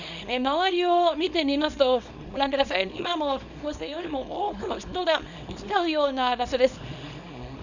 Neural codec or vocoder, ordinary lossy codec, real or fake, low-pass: codec, 24 kHz, 0.9 kbps, WavTokenizer, small release; none; fake; 7.2 kHz